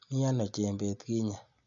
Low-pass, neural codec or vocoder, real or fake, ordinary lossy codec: 7.2 kHz; none; real; none